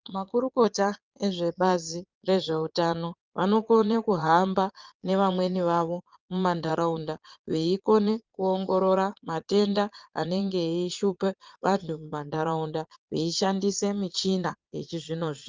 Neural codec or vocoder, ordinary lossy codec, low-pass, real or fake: vocoder, 22.05 kHz, 80 mel bands, Vocos; Opus, 24 kbps; 7.2 kHz; fake